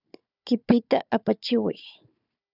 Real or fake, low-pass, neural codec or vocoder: fake; 5.4 kHz; codec, 16 kHz, 4 kbps, FunCodec, trained on Chinese and English, 50 frames a second